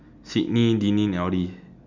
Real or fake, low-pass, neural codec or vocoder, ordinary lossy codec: real; 7.2 kHz; none; none